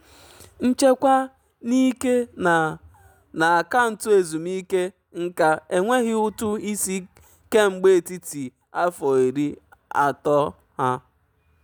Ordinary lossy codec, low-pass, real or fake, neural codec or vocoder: none; none; real; none